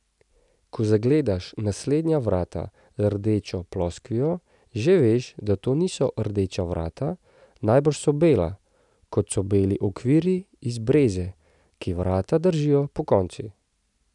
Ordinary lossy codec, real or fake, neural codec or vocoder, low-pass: none; real; none; 10.8 kHz